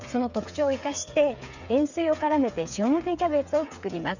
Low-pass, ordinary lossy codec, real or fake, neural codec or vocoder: 7.2 kHz; none; fake; codec, 16 kHz, 8 kbps, FreqCodec, smaller model